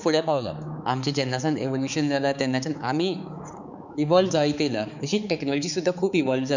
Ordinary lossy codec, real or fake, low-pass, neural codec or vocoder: none; fake; 7.2 kHz; codec, 16 kHz, 4 kbps, X-Codec, HuBERT features, trained on balanced general audio